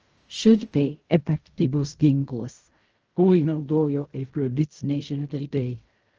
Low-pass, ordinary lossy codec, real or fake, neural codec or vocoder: 7.2 kHz; Opus, 24 kbps; fake; codec, 16 kHz in and 24 kHz out, 0.4 kbps, LongCat-Audio-Codec, fine tuned four codebook decoder